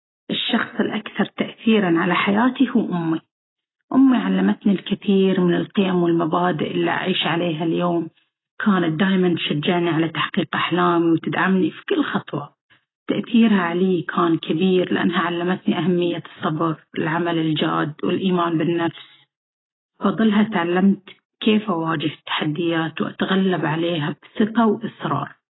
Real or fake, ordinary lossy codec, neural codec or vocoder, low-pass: real; AAC, 16 kbps; none; 7.2 kHz